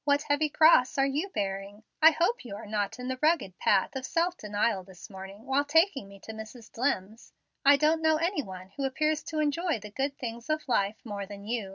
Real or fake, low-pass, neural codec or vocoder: real; 7.2 kHz; none